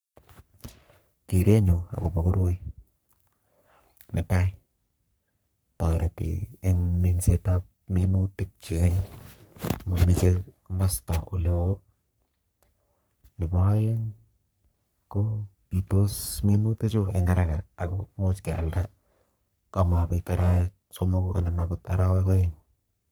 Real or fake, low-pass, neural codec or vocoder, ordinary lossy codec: fake; none; codec, 44.1 kHz, 3.4 kbps, Pupu-Codec; none